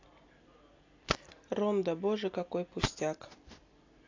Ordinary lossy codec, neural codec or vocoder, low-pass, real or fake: AAC, 48 kbps; none; 7.2 kHz; real